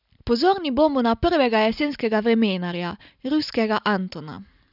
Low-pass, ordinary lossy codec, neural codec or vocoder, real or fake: 5.4 kHz; none; none; real